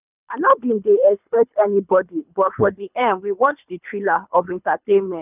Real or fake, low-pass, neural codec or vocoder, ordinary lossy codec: fake; 3.6 kHz; codec, 24 kHz, 3 kbps, HILCodec; none